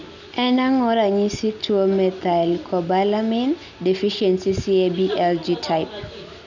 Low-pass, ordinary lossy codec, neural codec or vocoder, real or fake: 7.2 kHz; none; none; real